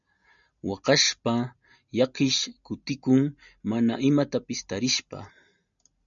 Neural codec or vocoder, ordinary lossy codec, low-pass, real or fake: none; MP3, 96 kbps; 7.2 kHz; real